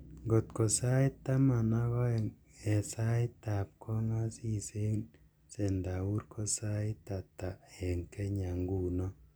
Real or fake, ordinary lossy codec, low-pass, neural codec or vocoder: real; none; none; none